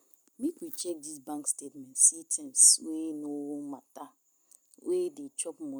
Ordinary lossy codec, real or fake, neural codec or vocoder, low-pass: none; real; none; none